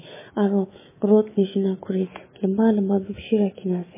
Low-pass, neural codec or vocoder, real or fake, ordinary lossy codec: 3.6 kHz; autoencoder, 48 kHz, 128 numbers a frame, DAC-VAE, trained on Japanese speech; fake; MP3, 16 kbps